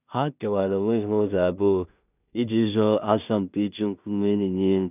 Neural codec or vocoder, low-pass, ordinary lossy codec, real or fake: codec, 16 kHz in and 24 kHz out, 0.4 kbps, LongCat-Audio-Codec, two codebook decoder; 3.6 kHz; none; fake